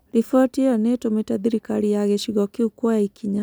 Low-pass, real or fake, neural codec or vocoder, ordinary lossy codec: none; real; none; none